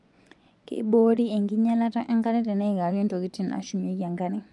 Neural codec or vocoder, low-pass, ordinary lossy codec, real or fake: vocoder, 24 kHz, 100 mel bands, Vocos; 10.8 kHz; none; fake